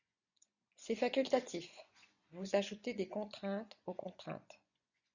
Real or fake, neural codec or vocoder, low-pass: fake; vocoder, 24 kHz, 100 mel bands, Vocos; 7.2 kHz